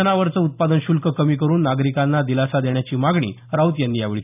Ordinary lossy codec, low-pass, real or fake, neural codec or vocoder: none; 3.6 kHz; real; none